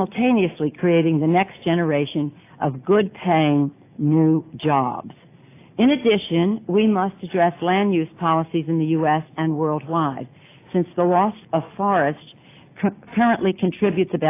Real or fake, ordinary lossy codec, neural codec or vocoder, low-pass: real; AAC, 24 kbps; none; 3.6 kHz